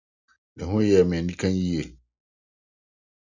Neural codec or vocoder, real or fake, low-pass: none; real; 7.2 kHz